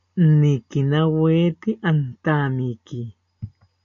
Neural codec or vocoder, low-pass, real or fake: none; 7.2 kHz; real